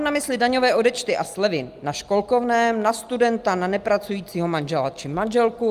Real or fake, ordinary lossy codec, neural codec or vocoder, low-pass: real; Opus, 32 kbps; none; 14.4 kHz